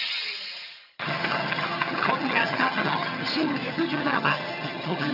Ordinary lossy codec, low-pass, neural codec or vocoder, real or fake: none; 5.4 kHz; vocoder, 22.05 kHz, 80 mel bands, HiFi-GAN; fake